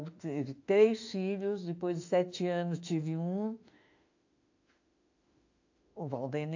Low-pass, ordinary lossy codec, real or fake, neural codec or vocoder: 7.2 kHz; AAC, 48 kbps; fake; autoencoder, 48 kHz, 32 numbers a frame, DAC-VAE, trained on Japanese speech